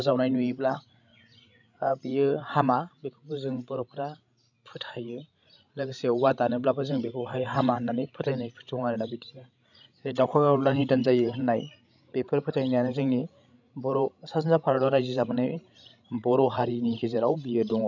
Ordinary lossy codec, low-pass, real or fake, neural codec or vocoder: none; 7.2 kHz; fake; codec, 16 kHz, 16 kbps, FreqCodec, larger model